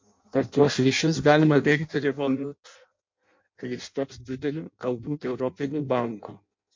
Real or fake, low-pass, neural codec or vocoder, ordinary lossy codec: fake; 7.2 kHz; codec, 16 kHz in and 24 kHz out, 0.6 kbps, FireRedTTS-2 codec; MP3, 48 kbps